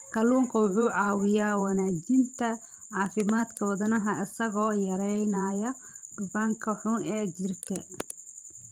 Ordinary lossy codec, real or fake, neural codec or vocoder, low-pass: Opus, 24 kbps; fake; vocoder, 44.1 kHz, 128 mel bands every 512 samples, BigVGAN v2; 19.8 kHz